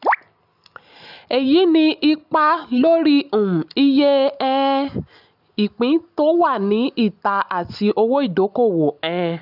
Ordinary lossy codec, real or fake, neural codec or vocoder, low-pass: none; real; none; 5.4 kHz